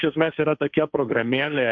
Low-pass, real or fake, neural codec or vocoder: 7.2 kHz; fake; codec, 16 kHz, 1.1 kbps, Voila-Tokenizer